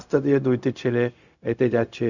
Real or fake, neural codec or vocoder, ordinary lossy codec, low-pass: fake; codec, 16 kHz, 0.4 kbps, LongCat-Audio-Codec; none; 7.2 kHz